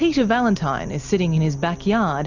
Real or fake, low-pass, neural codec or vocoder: real; 7.2 kHz; none